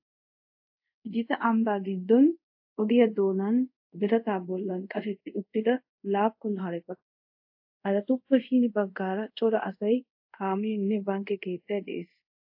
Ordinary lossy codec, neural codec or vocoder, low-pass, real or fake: AAC, 48 kbps; codec, 24 kHz, 0.5 kbps, DualCodec; 5.4 kHz; fake